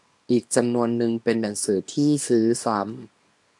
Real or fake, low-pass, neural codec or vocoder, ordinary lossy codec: fake; 10.8 kHz; codec, 24 kHz, 0.9 kbps, WavTokenizer, small release; AAC, 64 kbps